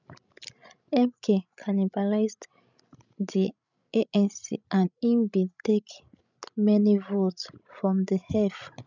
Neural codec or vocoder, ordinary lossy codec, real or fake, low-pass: codec, 16 kHz, 16 kbps, FreqCodec, larger model; none; fake; 7.2 kHz